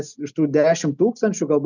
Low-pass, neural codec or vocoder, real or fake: 7.2 kHz; none; real